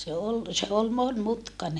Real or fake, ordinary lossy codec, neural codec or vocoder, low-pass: real; none; none; none